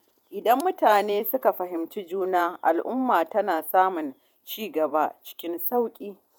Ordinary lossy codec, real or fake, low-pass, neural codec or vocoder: none; fake; none; vocoder, 48 kHz, 128 mel bands, Vocos